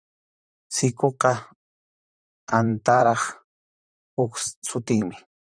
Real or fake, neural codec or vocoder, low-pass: fake; vocoder, 44.1 kHz, 128 mel bands, Pupu-Vocoder; 9.9 kHz